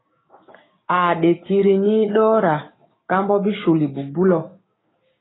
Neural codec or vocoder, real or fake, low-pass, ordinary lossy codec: codec, 16 kHz, 6 kbps, DAC; fake; 7.2 kHz; AAC, 16 kbps